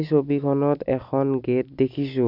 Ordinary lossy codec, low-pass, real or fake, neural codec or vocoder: none; 5.4 kHz; real; none